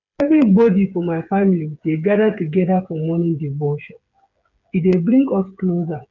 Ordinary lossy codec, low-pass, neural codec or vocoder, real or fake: none; 7.2 kHz; codec, 16 kHz, 8 kbps, FreqCodec, smaller model; fake